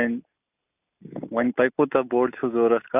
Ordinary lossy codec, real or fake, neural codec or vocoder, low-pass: none; real; none; 3.6 kHz